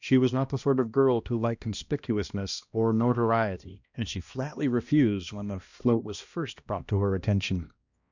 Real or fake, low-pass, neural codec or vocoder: fake; 7.2 kHz; codec, 16 kHz, 1 kbps, X-Codec, HuBERT features, trained on balanced general audio